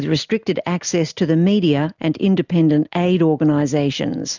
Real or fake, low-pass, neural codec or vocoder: real; 7.2 kHz; none